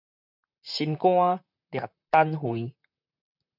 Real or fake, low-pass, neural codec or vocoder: fake; 5.4 kHz; vocoder, 44.1 kHz, 128 mel bands, Pupu-Vocoder